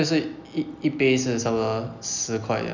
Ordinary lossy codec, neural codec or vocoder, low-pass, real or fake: none; none; 7.2 kHz; real